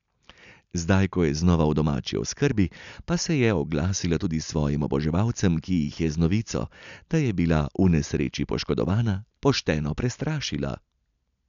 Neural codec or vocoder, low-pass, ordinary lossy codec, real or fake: none; 7.2 kHz; none; real